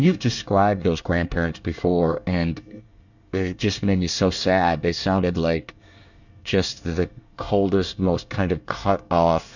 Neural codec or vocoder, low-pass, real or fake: codec, 24 kHz, 1 kbps, SNAC; 7.2 kHz; fake